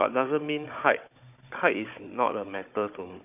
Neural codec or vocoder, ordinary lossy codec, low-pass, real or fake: codec, 16 kHz, 16 kbps, FunCodec, trained on Chinese and English, 50 frames a second; none; 3.6 kHz; fake